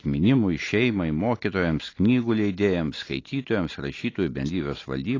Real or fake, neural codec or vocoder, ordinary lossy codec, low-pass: real; none; AAC, 32 kbps; 7.2 kHz